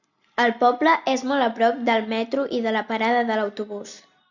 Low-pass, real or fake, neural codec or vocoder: 7.2 kHz; real; none